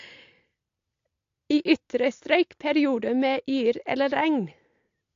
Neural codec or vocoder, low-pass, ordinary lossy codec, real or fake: none; 7.2 kHz; AAC, 48 kbps; real